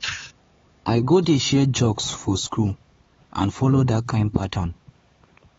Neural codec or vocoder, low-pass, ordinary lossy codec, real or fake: codec, 16 kHz, 4 kbps, X-Codec, HuBERT features, trained on LibriSpeech; 7.2 kHz; AAC, 24 kbps; fake